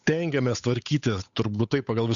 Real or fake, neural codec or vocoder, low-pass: fake; codec, 16 kHz, 8 kbps, FunCodec, trained on Chinese and English, 25 frames a second; 7.2 kHz